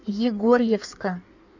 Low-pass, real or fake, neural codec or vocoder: 7.2 kHz; fake; codec, 16 kHz in and 24 kHz out, 1.1 kbps, FireRedTTS-2 codec